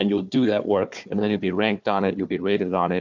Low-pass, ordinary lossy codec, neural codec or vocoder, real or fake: 7.2 kHz; MP3, 64 kbps; codec, 16 kHz, 4 kbps, FunCodec, trained on Chinese and English, 50 frames a second; fake